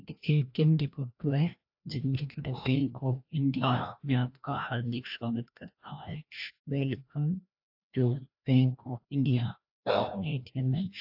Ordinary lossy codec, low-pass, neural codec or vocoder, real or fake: AAC, 48 kbps; 5.4 kHz; codec, 16 kHz, 1 kbps, FreqCodec, larger model; fake